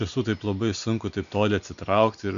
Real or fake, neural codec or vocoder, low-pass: real; none; 7.2 kHz